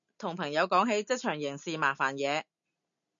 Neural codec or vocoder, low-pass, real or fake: none; 7.2 kHz; real